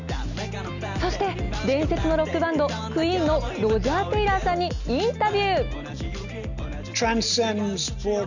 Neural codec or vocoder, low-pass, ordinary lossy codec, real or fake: none; 7.2 kHz; none; real